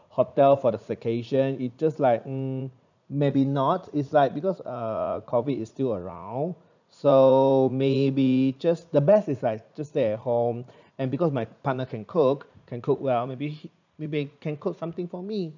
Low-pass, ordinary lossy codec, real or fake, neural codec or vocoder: 7.2 kHz; none; fake; vocoder, 44.1 kHz, 80 mel bands, Vocos